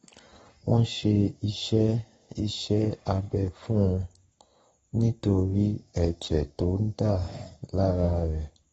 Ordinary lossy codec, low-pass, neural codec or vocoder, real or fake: AAC, 24 kbps; 19.8 kHz; codec, 44.1 kHz, 7.8 kbps, Pupu-Codec; fake